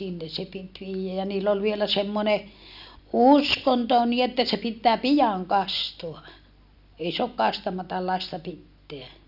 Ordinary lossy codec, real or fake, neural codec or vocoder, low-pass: none; real; none; 5.4 kHz